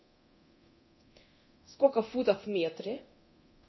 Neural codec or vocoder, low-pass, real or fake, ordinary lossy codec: codec, 24 kHz, 0.9 kbps, DualCodec; 7.2 kHz; fake; MP3, 24 kbps